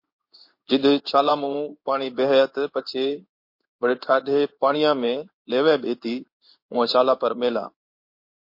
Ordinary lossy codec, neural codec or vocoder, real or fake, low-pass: MP3, 32 kbps; codec, 16 kHz in and 24 kHz out, 1 kbps, XY-Tokenizer; fake; 5.4 kHz